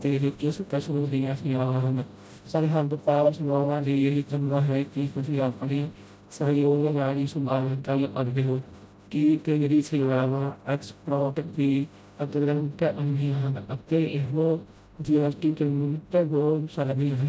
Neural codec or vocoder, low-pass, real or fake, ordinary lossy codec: codec, 16 kHz, 0.5 kbps, FreqCodec, smaller model; none; fake; none